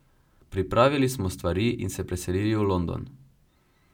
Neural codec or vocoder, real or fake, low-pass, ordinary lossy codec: none; real; 19.8 kHz; none